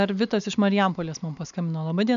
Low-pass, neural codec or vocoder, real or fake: 7.2 kHz; none; real